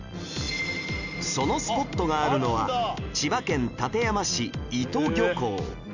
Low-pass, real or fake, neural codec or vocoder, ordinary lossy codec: 7.2 kHz; real; none; none